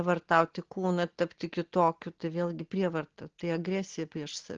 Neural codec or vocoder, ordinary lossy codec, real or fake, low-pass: none; Opus, 16 kbps; real; 7.2 kHz